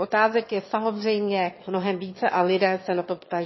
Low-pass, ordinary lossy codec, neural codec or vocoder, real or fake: 7.2 kHz; MP3, 24 kbps; autoencoder, 22.05 kHz, a latent of 192 numbers a frame, VITS, trained on one speaker; fake